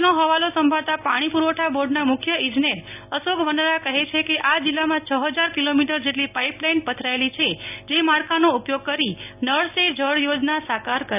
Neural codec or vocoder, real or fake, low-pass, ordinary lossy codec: none; real; 3.6 kHz; none